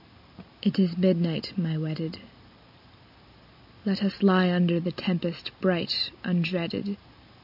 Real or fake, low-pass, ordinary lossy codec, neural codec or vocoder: real; 5.4 kHz; AAC, 48 kbps; none